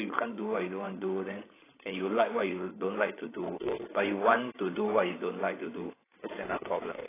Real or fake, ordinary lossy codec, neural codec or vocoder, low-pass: fake; AAC, 16 kbps; codec, 16 kHz, 16 kbps, FreqCodec, larger model; 3.6 kHz